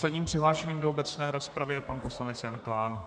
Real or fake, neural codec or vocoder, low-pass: fake; codec, 32 kHz, 1.9 kbps, SNAC; 9.9 kHz